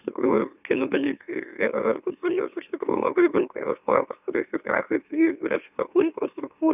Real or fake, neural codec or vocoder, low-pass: fake; autoencoder, 44.1 kHz, a latent of 192 numbers a frame, MeloTTS; 3.6 kHz